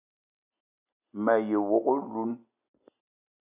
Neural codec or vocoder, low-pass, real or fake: none; 3.6 kHz; real